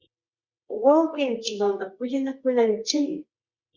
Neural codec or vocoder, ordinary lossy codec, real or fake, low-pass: codec, 24 kHz, 0.9 kbps, WavTokenizer, medium music audio release; Opus, 64 kbps; fake; 7.2 kHz